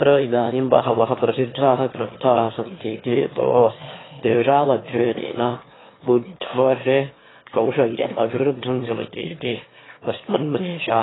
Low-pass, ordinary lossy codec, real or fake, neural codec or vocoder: 7.2 kHz; AAC, 16 kbps; fake; autoencoder, 22.05 kHz, a latent of 192 numbers a frame, VITS, trained on one speaker